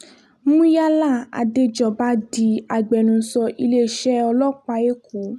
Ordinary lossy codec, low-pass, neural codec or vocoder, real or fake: none; 14.4 kHz; none; real